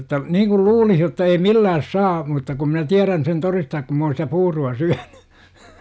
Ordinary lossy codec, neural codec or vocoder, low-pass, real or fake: none; none; none; real